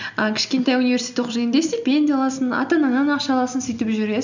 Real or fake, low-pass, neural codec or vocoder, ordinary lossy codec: real; 7.2 kHz; none; none